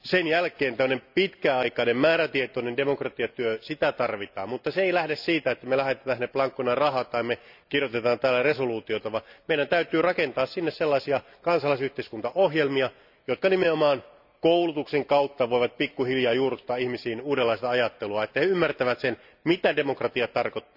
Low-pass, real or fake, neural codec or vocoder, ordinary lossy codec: 5.4 kHz; real; none; none